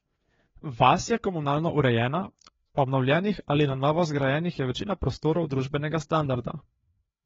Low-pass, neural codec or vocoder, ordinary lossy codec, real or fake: 7.2 kHz; codec, 16 kHz, 4 kbps, FreqCodec, larger model; AAC, 24 kbps; fake